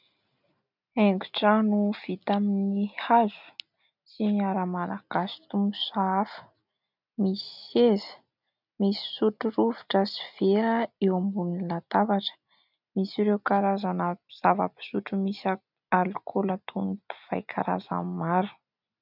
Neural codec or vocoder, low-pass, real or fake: none; 5.4 kHz; real